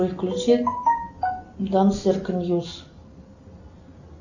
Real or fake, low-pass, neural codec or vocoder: real; 7.2 kHz; none